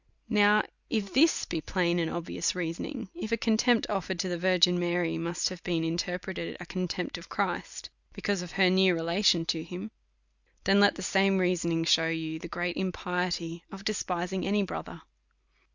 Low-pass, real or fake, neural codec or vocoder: 7.2 kHz; real; none